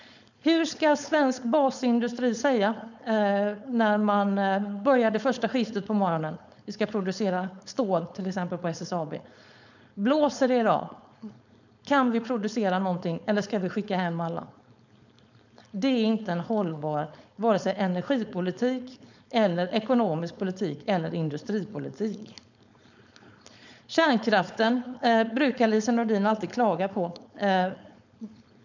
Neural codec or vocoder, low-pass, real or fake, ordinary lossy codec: codec, 16 kHz, 4.8 kbps, FACodec; 7.2 kHz; fake; none